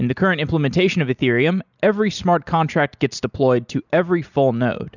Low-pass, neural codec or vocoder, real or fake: 7.2 kHz; none; real